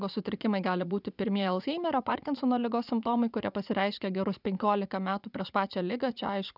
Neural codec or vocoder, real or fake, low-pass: vocoder, 44.1 kHz, 80 mel bands, Vocos; fake; 5.4 kHz